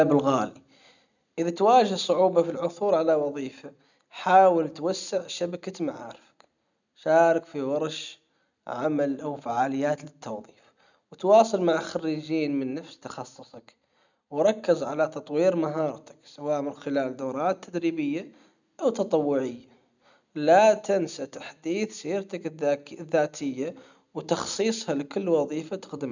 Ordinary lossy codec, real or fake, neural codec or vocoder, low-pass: none; real; none; 7.2 kHz